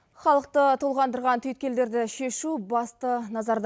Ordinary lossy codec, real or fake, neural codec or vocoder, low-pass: none; real; none; none